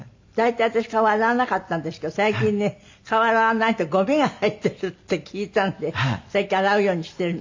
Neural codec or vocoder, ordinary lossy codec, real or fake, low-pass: none; MP3, 48 kbps; real; 7.2 kHz